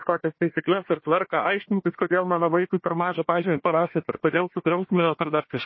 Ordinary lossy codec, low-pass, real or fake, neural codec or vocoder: MP3, 24 kbps; 7.2 kHz; fake; codec, 16 kHz, 1 kbps, FunCodec, trained on Chinese and English, 50 frames a second